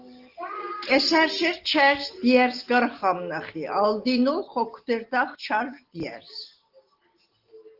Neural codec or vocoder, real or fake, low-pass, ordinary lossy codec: none; real; 5.4 kHz; Opus, 16 kbps